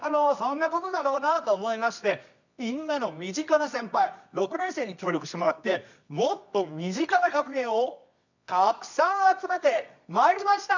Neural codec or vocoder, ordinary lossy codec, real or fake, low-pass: codec, 24 kHz, 0.9 kbps, WavTokenizer, medium music audio release; none; fake; 7.2 kHz